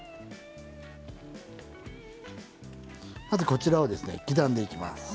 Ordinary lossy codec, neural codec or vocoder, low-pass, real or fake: none; none; none; real